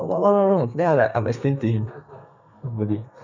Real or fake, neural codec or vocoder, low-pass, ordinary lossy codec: fake; codec, 32 kHz, 1.9 kbps, SNAC; 7.2 kHz; none